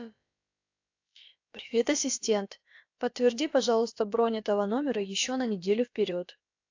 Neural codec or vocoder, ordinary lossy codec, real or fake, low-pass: codec, 16 kHz, about 1 kbps, DyCAST, with the encoder's durations; AAC, 48 kbps; fake; 7.2 kHz